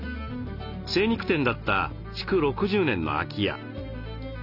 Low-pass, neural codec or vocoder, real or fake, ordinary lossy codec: 5.4 kHz; none; real; none